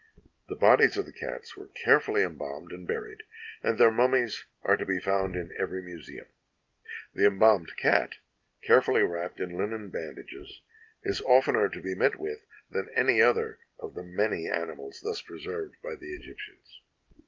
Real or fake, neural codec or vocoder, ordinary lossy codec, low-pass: real; none; Opus, 32 kbps; 7.2 kHz